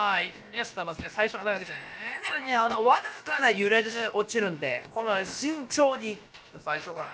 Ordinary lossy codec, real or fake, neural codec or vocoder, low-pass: none; fake; codec, 16 kHz, about 1 kbps, DyCAST, with the encoder's durations; none